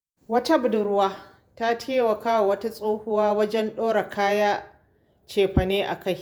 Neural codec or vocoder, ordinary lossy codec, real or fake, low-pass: vocoder, 48 kHz, 128 mel bands, Vocos; none; fake; none